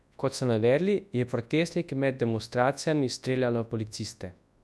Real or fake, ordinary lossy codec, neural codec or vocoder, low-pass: fake; none; codec, 24 kHz, 0.9 kbps, WavTokenizer, large speech release; none